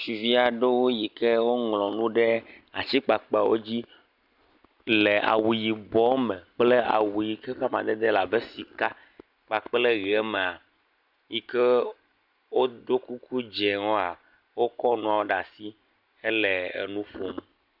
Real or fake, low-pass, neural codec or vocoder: real; 5.4 kHz; none